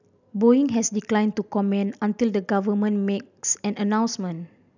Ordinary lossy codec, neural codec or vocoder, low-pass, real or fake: none; none; 7.2 kHz; real